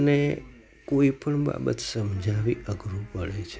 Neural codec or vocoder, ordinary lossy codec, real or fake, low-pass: none; none; real; none